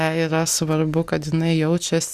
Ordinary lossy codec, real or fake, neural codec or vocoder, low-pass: Opus, 64 kbps; real; none; 14.4 kHz